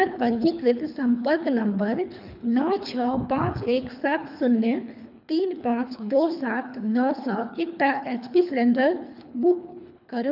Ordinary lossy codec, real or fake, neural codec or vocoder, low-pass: none; fake; codec, 24 kHz, 3 kbps, HILCodec; 5.4 kHz